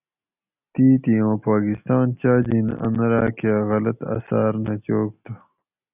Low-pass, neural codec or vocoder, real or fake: 3.6 kHz; none; real